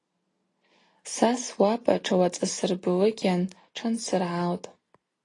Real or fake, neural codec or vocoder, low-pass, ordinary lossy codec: real; none; 10.8 kHz; AAC, 32 kbps